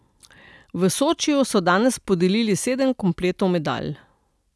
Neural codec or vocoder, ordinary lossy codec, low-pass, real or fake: none; none; none; real